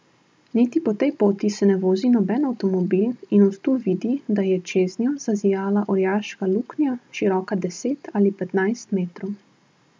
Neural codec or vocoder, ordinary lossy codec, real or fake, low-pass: none; none; real; none